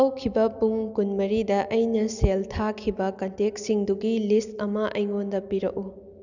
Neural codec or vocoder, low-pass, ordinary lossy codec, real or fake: none; 7.2 kHz; none; real